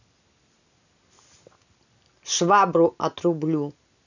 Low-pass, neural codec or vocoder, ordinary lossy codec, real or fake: 7.2 kHz; none; none; real